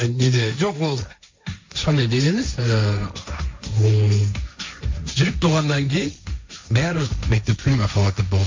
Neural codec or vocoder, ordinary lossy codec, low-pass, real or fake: codec, 16 kHz, 1.1 kbps, Voila-Tokenizer; MP3, 64 kbps; 7.2 kHz; fake